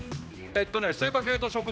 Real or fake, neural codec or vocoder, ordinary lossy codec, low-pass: fake; codec, 16 kHz, 2 kbps, X-Codec, HuBERT features, trained on general audio; none; none